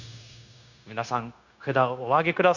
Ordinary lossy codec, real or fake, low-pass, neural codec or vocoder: none; fake; 7.2 kHz; codec, 16 kHz, 0.9 kbps, LongCat-Audio-Codec